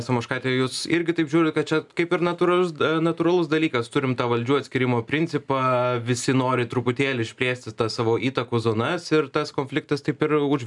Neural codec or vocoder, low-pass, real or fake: none; 10.8 kHz; real